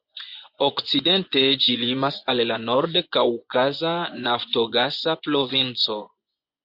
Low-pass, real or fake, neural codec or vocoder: 5.4 kHz; fake; vocoder, 24 kHz, 100 mel bands, Vocos